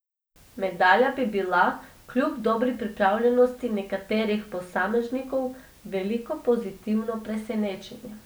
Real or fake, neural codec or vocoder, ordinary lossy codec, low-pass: real; none; none; none